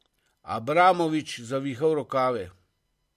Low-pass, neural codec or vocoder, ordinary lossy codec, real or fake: 14.4 kHz; none; MP3, 64 kbps; real